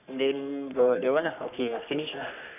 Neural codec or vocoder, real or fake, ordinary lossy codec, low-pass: codec, 44.1 kHz, 3.4 kbps, Pupu-Codec; fake; none; 3.6 kHz